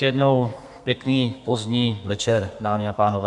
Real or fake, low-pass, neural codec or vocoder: fake; 10.8 kHz; codec, 32 kHz, 1.9 kbps, SNAC